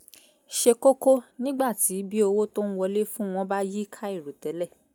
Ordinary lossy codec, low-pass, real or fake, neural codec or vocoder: none; none; real; none